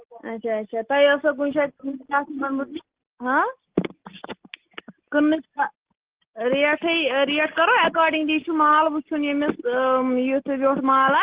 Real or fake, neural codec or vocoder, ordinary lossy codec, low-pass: real; none; Opus, 16 kbps; 3.6 kHz